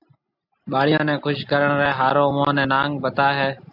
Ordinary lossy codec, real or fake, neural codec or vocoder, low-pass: AAC, 48 kbps; real; none; 5.4 kHz